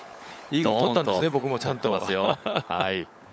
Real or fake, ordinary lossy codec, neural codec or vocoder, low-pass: fake; none; codec, 16 kHz, 16 kbps, FunCodec, trained on LibriTTS, 50 frames a second; none